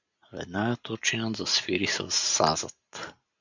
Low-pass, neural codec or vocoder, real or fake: 7.2 kHz; none; real